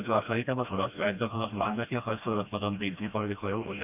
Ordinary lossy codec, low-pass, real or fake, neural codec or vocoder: none; 3.6 kHz; fake; codec, 16 kHz, 1 kbps, FreqCodec, smaller model